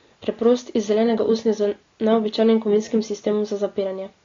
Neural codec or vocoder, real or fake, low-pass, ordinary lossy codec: none; real; 7.2 kHz; AAC, 32 kbps